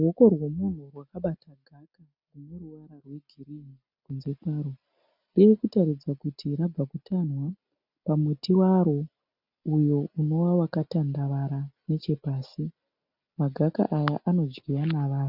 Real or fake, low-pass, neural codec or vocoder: real; 5.4 kHz; none